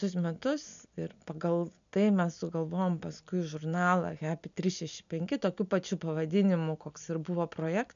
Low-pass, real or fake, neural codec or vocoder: 7.2 kHz; real; none